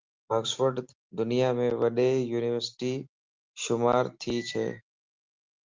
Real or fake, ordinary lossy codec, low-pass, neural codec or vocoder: real; Opus, 24 kbps; 7.2 kHz; none